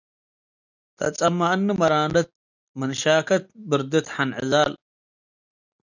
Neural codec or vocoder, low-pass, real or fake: none; 7.2 kHz; real